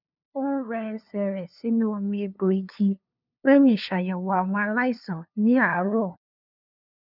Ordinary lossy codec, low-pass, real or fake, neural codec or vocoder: none; 5.4 kHz; fake; codec, 16 kHz, 2 kbps, FunCodec, trained on LibriTTS, 25 frames a second